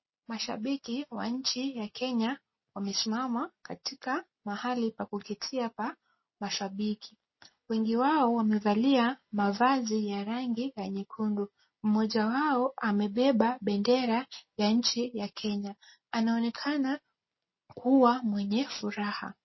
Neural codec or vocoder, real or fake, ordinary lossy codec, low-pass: none; real; MP3, 24 kbps; 7.2 kHz